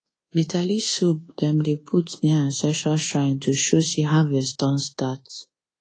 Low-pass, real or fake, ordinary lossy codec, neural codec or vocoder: 9.9 kHz; fake; AAC, 32 kbps; codec, 24 kHz, 1.2 kbps, DualCodec